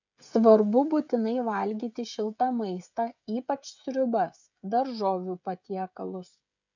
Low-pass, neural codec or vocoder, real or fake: 7.2 kHz; codec, 16 kHz, 16 kbps, FreqCodec, smaller model; fake